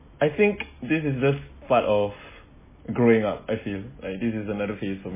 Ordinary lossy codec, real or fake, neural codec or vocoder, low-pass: MP3, 16 kbps; real; none; 3.6 kHz